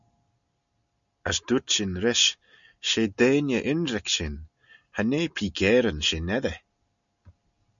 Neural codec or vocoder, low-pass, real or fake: none; 7.2 kHz; real